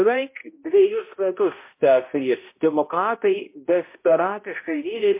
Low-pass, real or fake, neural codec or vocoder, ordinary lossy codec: 3.6 kHz; fake; codec, 16 kHz, 0.5 kbps, X-Codec, HuBERT features, trained on balanced general audio; MP3, 24 kbps